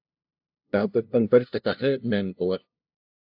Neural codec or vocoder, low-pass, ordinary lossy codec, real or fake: codec, 16 kHz, 0.5 kbps, FunCodec, trained on LibriTTS, 25 frames a second; 5.4 kHz; AAC, 48 kbps; fake